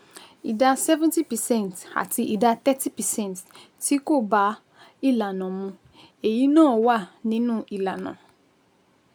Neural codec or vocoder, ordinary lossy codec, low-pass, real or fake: none; none; none; real